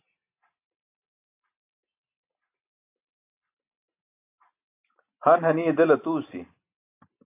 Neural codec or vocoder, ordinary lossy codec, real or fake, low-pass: none; AAC, 24 kbps; real; 3.6 kHz